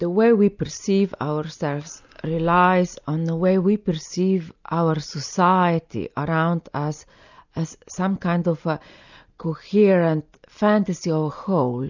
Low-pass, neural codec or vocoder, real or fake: 7.2 kHz; none; real